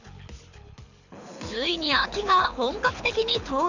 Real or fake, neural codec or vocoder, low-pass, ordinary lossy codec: fake; codec, 24 kHz, 6 kbps, HILCodec; 7.2 kHz; none